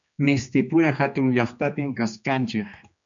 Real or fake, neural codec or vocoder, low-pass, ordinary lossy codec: fake; codec, 16 kHz, 2 kbps, X-Codec, HuBERT features, trained on general audio; 7.2 kHz; MP3, 64 kbps